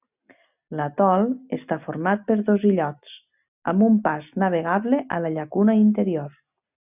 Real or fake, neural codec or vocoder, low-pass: real; none; 3.6 kHz